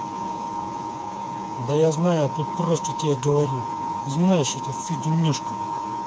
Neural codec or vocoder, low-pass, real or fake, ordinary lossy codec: codec, 16 kHz, 4 kbps, FreqCodec, smaller model; none; fake; none